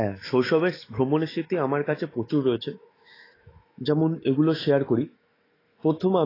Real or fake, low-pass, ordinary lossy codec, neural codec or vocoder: fake; 5.4 kHz; AAC, 24 kbps; autoencoder, 48 kHz, 128 numbers a frame, DAC-VAE, trained on Japanese speech